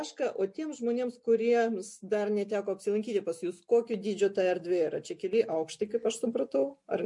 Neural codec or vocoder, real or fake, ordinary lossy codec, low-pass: none; real; MP3, 48 kbps; 10.8 kHz